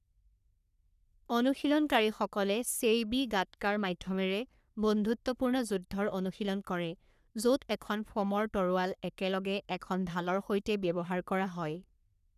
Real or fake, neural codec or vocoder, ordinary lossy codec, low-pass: fake; codec, 44.1 kHz, 3.4 kbps, Pupu-Codec; none; 14.4 kHz